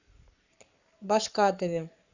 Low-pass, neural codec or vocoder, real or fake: 7.2 kHz; codec, 44.1 kHz, 7.8 kbps, Pupu-Codec; fake